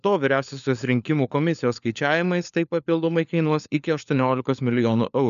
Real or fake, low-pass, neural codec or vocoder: fake; 7.2 kHz; codec, 16 kHz, 4 kbps, FunCodec, trained on LibriTTS, 50 frames a second